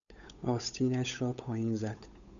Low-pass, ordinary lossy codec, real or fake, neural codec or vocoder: 7.2 kHz; MP3, 96 kbps; fake; codec, 16 kHz, 8 kbps, FunCodec, trained on Chinese and English, 25 frames a second